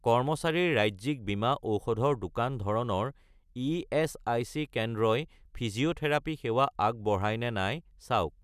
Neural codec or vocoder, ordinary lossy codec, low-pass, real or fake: none; none; 14.4 kHz; real